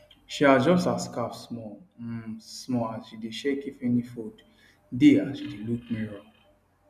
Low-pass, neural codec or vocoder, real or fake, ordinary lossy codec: 14.4 kHz; none; real; none